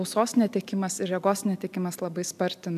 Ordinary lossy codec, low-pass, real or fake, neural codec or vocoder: AAC, 96 kbps; 14.4 kHz; real; none